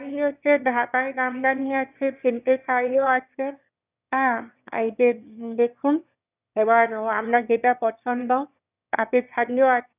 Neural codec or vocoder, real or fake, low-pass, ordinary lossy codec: autoencoder, 22.05 kHz, a latent of 192 numbers a frame, VITS, trained on one speaker; fake; 3.6 kHz; none